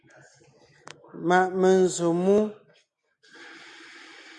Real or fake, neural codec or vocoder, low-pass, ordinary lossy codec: real; none; 10.8 kHz; MP3, 96 kbps